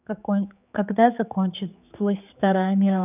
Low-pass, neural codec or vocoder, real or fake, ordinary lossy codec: 3.6 kHz; codec, 16 kHz, 4 kbps, X-Codec, HuBERT features, trained on general audio; fake; none